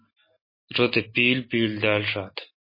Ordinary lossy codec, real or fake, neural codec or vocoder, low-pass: MP3, 24 kbps; real; none; 5.4 kHz